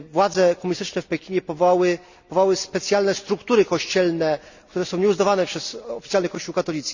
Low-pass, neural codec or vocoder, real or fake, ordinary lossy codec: 7.2 kHz; none; real; Opus, 64 kbps